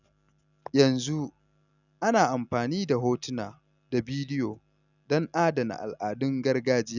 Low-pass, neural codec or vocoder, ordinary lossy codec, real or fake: 7.2 kHz; none; none; real